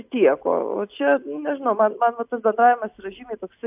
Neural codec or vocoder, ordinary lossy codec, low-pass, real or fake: none; AAC, 32 kbps; 3.6 kHz; real